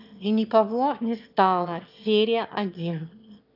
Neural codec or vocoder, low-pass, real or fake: autoencoder, 22.05 kHz, a latent of 192 numbers a frame, VITS, trained on one speaker; 5.4 kHz; fake